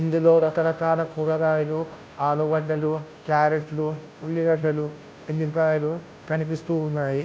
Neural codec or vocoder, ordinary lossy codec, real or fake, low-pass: codec, 16 kHz, 0.5 kbps, FunCodec, trained on Chinese and English, 25 frames a second; none; fake; none